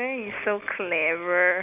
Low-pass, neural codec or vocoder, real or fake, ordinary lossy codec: 3.6 kHz; none; real; none